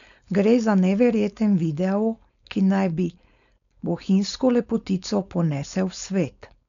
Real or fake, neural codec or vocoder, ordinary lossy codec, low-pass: fake; codec, 16 kHz, 4.8 kbps, FACodec; none; 7.2 kHz